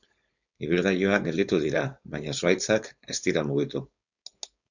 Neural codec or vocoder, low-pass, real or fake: codec, 16 kHz, 4.8 kbps, FACodec; 7.2 kHz; fake